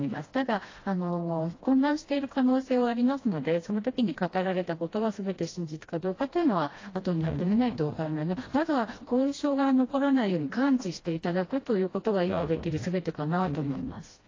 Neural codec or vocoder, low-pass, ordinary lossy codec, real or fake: codec, 16 kHz, 1 kbps, FreqCodec, smaller model; 7.2 kHz; AAC, 32 kbps; fake